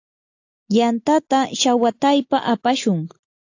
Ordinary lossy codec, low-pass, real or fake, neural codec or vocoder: AAC, 48 kbps; 7.2 kHz; real; none